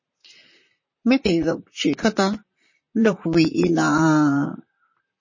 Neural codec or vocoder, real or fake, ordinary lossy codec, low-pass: vocoder, 44.1 kHz, 128 mel bands, Pupu-Vocoder; fake; MP3, 32 kbps; 7.2 kHz